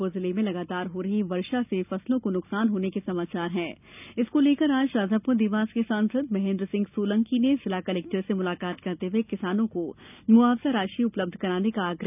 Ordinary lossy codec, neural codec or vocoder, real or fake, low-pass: none; none; real; 3.6 kHz